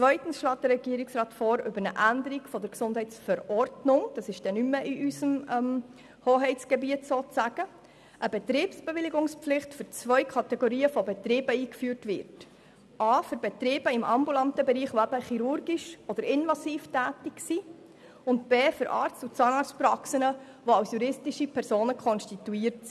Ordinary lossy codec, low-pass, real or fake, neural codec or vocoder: none; none; real; none